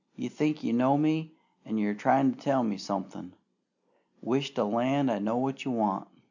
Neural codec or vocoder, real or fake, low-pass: none; real; 7.2 kHz